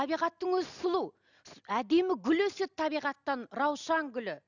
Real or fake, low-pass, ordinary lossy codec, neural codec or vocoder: real; 7.2 kHz; none; none